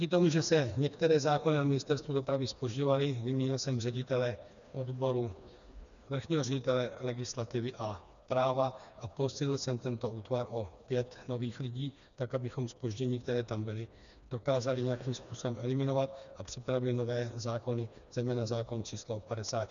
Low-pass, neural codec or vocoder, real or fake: 7.2 kHz; codec, 16 kHz, 2 kbps, FreqCodec, smaller model; fake